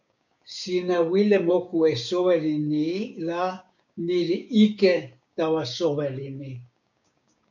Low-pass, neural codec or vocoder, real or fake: 7.2 kHz; codec, 16 kHz, 6 kbps, DAC; fake